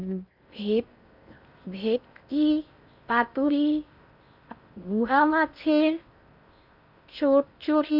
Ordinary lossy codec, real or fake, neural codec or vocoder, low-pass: none; fake; codec, 16 kHz in and 24 kHz out, 0.6 kbps, FocalCodec, streaming, 2048 codes; 5.4 kHz